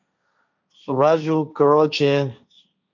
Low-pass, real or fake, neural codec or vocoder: 7.2 kHz; fake; codec, 16 kHz, 1.1 kbps, Voila-Tokenizer